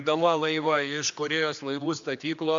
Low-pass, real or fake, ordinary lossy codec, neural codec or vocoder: 7.2 kHz; fake; AAC, 64 kbps; codec, 16 kHz, 2 kbps, X-Codec, HuBERT features, trained on general audio